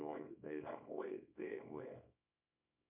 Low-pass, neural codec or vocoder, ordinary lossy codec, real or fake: 3.6 kHz; codec, 24 kHz, 0.9 kbps, WavTokenizer, small release; MP3, 32 kbps; fake